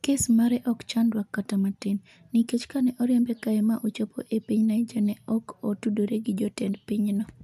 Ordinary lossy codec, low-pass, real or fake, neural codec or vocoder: none; 14.4 kHz; real; none